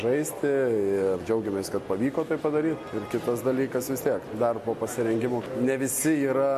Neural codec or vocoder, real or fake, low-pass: none; real; 14.4 kHz